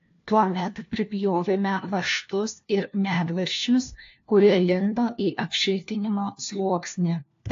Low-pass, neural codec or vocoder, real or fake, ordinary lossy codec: 7.2 kHz; codec, 16 kHz, 1 kbps, FunCodec, trained on LibriTTS, 50 frames a second; fake; AAC, 48 kbps